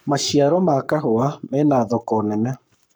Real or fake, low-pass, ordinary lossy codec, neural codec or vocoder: fake; none; none; codec, 44.1 kHz, 7.8 kbps, Pupu-Codec